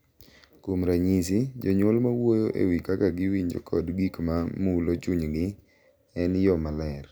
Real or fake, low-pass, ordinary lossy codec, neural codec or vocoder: real; none; none; none